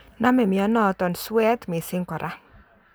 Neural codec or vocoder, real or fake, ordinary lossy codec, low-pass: none; real; none; none